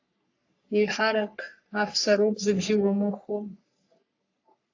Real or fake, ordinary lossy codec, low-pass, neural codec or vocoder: fake; AAC, 48 kbps; 7.2 kHz; codec, 44.1 kHz, 3.4 kbps, Pupu-Codec